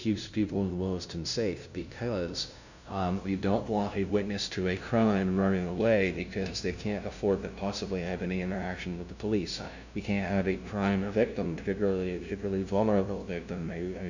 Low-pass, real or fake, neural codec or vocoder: 7.2 kHz; fake; codec, 16 kHz, 0.5 kbps, FunCodec, trained on LibriTTS, 25 frames a second